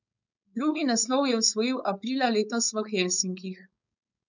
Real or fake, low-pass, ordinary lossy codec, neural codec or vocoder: fake; 7.2 kHz; none; codec, 16 kHz, 4.8 kbps, FACodec